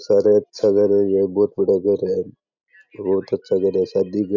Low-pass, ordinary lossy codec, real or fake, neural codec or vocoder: 7.2 kHz; none; real; none